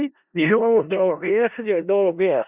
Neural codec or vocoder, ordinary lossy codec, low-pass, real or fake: codec, 16 kHz in and 24 kHz out, 0.4 kbps, LongCat-Audio-Codec, four codebook decoder; Opus, 64 kbps; 3.6 kHz; fake